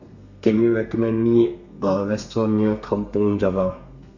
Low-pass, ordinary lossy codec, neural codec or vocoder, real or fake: 7.2 kHz; none; codec, 32 kHz, 1.9 kbps, SNAC; fake